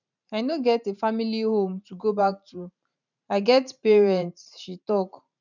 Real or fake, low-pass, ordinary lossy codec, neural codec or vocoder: fake; 7.2 kHz; none; vocoder, 44.1 kHz, 128 mel bands every 512 samples, BigVGAN v2